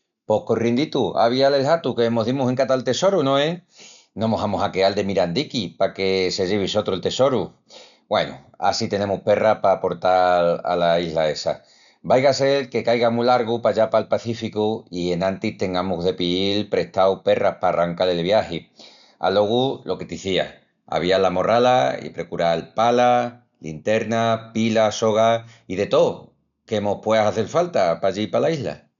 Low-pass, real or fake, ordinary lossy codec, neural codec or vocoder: 7.2 kHz; real; none; none